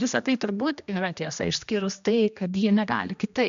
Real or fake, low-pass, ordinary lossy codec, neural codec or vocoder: fake; 7.2 kHz; MP3, 64 kbps; codec, 16 kHz, 1 kbps, X-Codec, HuBERT features, trained on general audio